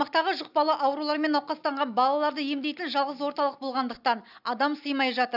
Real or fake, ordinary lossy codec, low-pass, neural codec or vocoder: real; none; 5.4 kHz; none